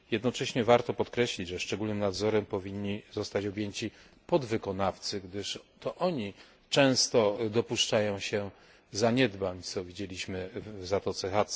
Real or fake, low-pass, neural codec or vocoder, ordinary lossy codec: real; none; none; none